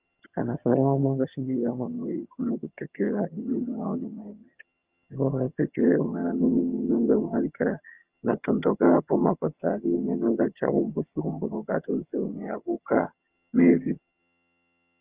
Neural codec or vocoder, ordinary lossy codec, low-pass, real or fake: vocoder, 22.05 kHz, 80 mel bands, HiFi-GAN; Opus, 24 kbps; 3.6 kHz; fake